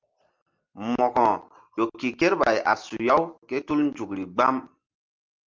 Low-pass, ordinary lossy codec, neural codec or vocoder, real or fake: 7.2 kHz; Opus, 24 kbps; none; real